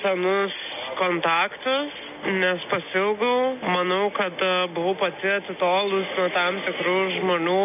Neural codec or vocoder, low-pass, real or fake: none; 3.6 kHz; real